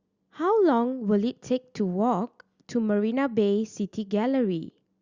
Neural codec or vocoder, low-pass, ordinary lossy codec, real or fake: none; 7.2 kHz; Opus, 64 kbps; real